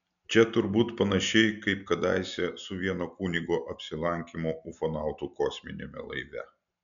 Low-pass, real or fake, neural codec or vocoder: 7.2 kHz; real; none